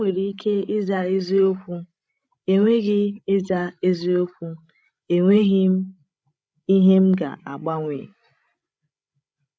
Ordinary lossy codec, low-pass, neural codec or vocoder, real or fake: none; none; codec, 16 kHz, 16 kbps, FreqCodec, larger model; fake